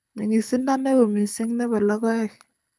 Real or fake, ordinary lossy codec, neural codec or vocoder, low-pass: fake; none; codec, 24 kHz, 6 kbps, HILCodec; none